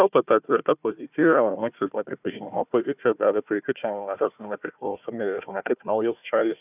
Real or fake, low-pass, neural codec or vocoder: fake; 3.6 kHz; codec, 16 kHz, 1 kbps, FunCodec, trained on Chinese and English, 50 frames a second